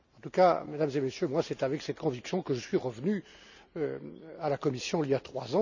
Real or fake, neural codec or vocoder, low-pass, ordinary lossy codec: real; none; 7.2 kHz; none